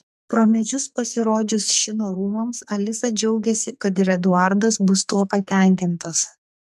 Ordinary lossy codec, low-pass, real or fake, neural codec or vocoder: MP3, 96 kbps; 14.4 kHz; fake; codec, 44.1 kHz, 2.6 kbps, SNAC